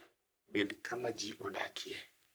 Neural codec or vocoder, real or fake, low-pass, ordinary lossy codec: codec, 44.1 kHz, 3.4 kbps, Pupu-Codec; fake; none; none